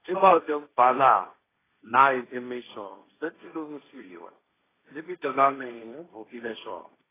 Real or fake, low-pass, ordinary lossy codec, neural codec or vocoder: fake; 3.6 kHz; AAC, 16 kbps; codec, 16 kHz, 1.1 kbps, Voila-Tokenizer